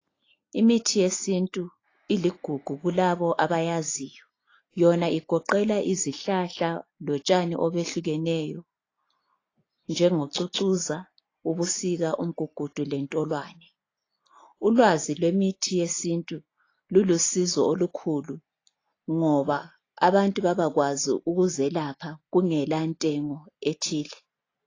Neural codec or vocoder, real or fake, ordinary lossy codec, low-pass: none; real; AAC, 32 kbps; 7.2 kHz